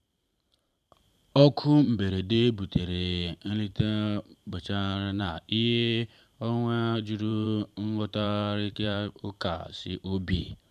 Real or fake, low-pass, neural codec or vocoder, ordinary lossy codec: fake; 14.4 kHz; vocoder, 44.1 kHz, 128 mel bands every 512 samples, BigVGAN v2; none